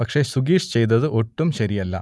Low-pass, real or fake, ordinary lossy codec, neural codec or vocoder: none; real; none; none